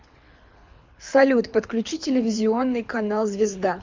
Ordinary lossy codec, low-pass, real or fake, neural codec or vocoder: MP3, 64 kbps; 7.2 kHz; fake; codec, 24 kHz, 6 kbps, HILCodec